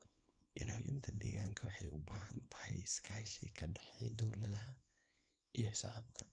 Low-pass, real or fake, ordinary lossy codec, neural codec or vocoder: 9.9 kHz; fake; none; codec, 24 kHz, 0.9 kbps, WavTokenizer, small release